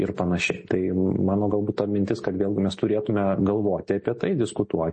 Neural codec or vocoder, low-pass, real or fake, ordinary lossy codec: none; 10.8 kHz; real; MP3, 32 kbps